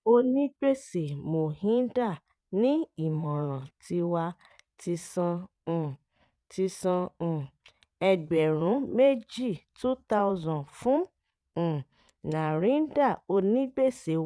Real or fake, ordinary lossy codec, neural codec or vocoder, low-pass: fake; none; vocoder, 22.05 kHz, 80 mel bands, Vocos; none